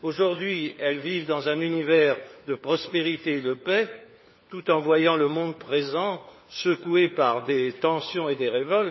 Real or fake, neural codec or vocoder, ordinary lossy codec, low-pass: fake; codec, 16 kHz, 4 kbps, FunCodec, trained on LibriTTS, 50 frames a second; MP3, 24 kbps; 7.2 kHz